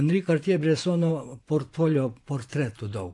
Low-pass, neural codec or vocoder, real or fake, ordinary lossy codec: 10.8 kHz; vocoder, 24 kHz, 100 mel bands, Vocos; fake; AAC, 64 kbps